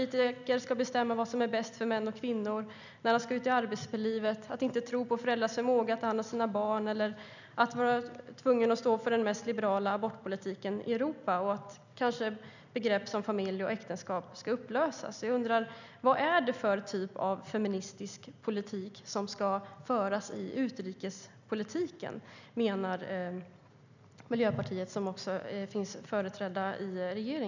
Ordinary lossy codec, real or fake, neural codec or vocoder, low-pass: none; real; none; 7.2 kHz